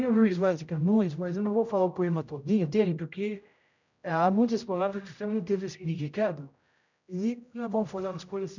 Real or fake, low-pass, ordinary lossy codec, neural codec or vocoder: fake; 7.2 kHz; none; codec, 16 kHz, 0.5 kbps, X-Codec, HuBERT features, trained on general audio